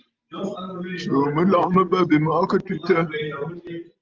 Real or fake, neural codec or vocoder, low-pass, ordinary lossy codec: real; none; 7.2 kHz; Opus, 24 kbps